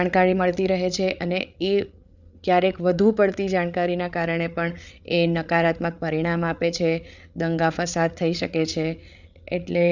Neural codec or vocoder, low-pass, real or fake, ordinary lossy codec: codec, 16 kHz, 8 kbps, FreqCodec, larger model; 7.2 kHz; fake; none